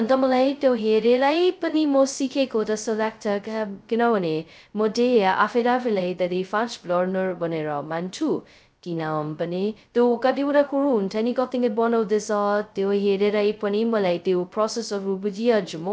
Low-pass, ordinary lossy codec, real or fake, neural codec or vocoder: none; none; fake; codec, 16 kHz, 0.2 kbps, FocalCodec